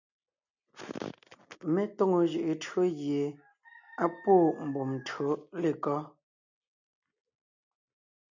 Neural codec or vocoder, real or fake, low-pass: none; real; 7.2 kHz